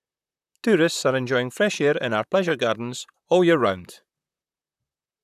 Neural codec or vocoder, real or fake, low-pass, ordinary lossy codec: vocoder, 44.1 kHz, 128 mel bands, Pupu-Vocoder; fake; 14.4 kHz; none